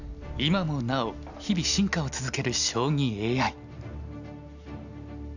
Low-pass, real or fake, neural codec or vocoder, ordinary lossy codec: 7.2 kHz; real; none; none